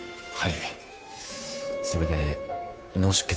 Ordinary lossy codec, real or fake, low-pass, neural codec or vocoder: none; fake; none; codec, 16 kHz, 2 kbps, FunCodec, trained on Chinese and English, 25 frames a second